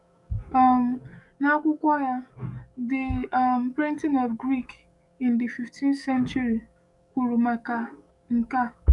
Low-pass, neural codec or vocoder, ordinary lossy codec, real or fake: 10.8 kHz; codec, 44.1 kHz, 7.8 kbps, DAC; none; fake